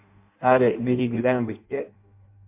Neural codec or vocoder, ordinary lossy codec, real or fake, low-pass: codec, 16 kHz in and 24 kHz out, 0.6 kbps, FireRedTTS-2 codec; MP3, 32 kbps; fake; 3.6 kHz